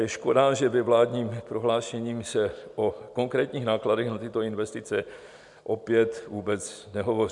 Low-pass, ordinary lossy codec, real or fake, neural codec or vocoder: 10.8 kHz; MP3, 96 kbps; real; none